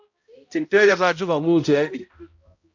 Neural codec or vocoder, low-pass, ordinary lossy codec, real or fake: codec, 16 kHz, 0.5 kbps, X-Codec, HuBERT features, trained on balanced general audio; 7.2 kHz; AAC, 48 kbps; fake